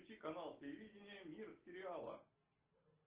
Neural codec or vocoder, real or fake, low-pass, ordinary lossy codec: none; real; 3.6 kHz; Opus, 16 kbps